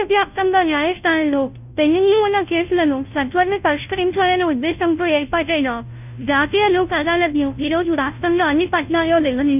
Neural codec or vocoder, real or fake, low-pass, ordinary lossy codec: codec, 16 kHz, 0.5 kbps, FunCodec, trained on Chinese and English, 25 frames a second; fake; 3.6 kHz; none